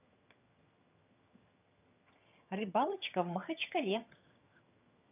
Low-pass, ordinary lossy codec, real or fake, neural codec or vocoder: 3.6 kHz; none; fake; vocoder, 22.05 kHz, 80 mel bands, HiFi-GAN